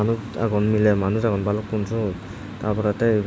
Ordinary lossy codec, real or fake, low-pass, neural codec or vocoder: none; real; none; none